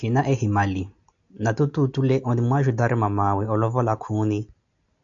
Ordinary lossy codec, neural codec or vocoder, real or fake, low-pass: AAC, 64 kbps; none; real; 7.2 kHz